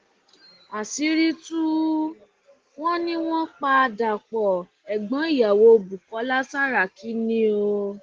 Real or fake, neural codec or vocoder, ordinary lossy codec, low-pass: real; none; Opus, 16 kbps; 7.2 kHz